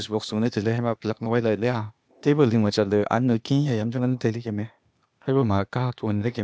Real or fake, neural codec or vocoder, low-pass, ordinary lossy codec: fake; codec, 16 kHz, 0.8 kbps, ZipCodec; none; none